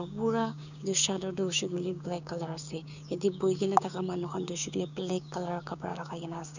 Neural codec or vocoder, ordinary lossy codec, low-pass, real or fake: codec, 16 kHz, 6 kbps, DAC; none; 7.2 kHz; fake